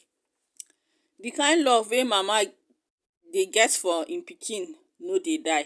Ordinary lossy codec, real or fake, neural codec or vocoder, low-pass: none; real; none; none